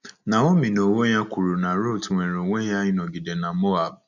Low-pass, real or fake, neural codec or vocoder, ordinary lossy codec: 7.2 kHz; real; none; none